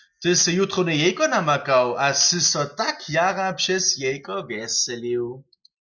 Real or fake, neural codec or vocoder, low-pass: real; none; 7.2 kHz